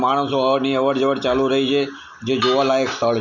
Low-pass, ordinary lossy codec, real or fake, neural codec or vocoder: 7.2 kHz; none; real; none